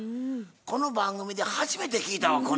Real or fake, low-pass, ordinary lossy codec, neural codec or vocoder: real; none; none; none